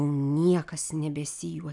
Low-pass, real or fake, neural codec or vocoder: 10.8 kHz; real; none